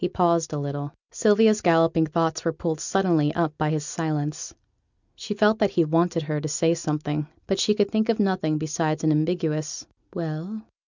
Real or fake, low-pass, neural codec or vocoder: real; 7.2 kHz; none